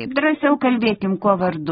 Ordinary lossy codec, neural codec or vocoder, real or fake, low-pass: AAC, 16 kbps; none; real; 7.2 kHz